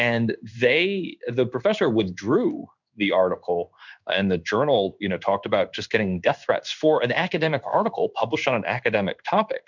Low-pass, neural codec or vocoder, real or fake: 7.2 kHz; codec, 16 kHz in and 24 kHz out, 1 kbps, XY-Tokenizer; fake